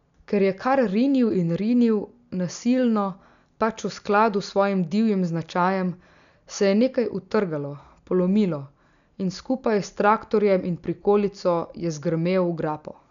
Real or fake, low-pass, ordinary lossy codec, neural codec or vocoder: real; 7.2 kHz; none; none